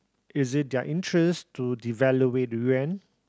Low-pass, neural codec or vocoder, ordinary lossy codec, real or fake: none; none; none; real